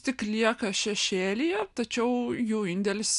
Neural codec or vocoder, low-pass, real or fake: none; 10.8 kHz; real